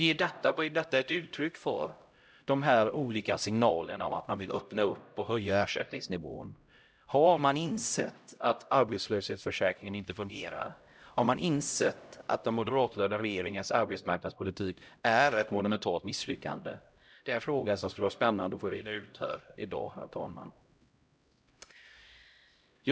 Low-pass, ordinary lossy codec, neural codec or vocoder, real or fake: none; none; codec, 16 kHz, 0.5 kbps, X-Codec, HuBERT features, trained on LibriSpeech; fake